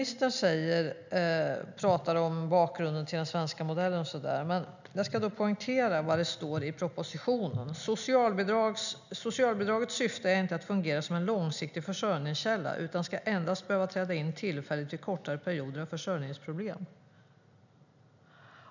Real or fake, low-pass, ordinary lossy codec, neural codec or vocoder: real; 7.2 kHz; none; none